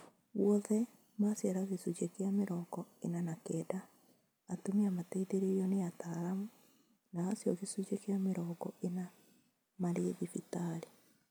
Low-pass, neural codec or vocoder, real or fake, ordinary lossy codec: none; none; real; none